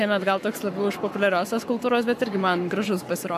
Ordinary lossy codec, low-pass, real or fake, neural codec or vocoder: AAC, 96 kbps; 14.4 kHz; fake; codec, 44.1 kHz, 7.8 kbps, Pupu-Codec